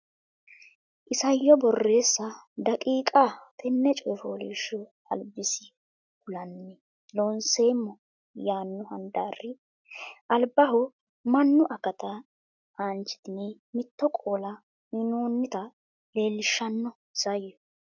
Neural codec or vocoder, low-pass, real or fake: none; 7.2 kHz; real